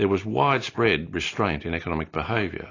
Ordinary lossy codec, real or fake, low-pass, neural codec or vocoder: AAC, 32 kbps; real; 7.2 kHz; none